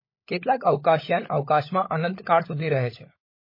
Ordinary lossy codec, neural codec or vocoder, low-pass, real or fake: MP3, 24 kbps; codec, 16 kHz, 16 kbps, FunCodec, trained on LibriTTS, 50 frames a second; 5.4 kHz; fake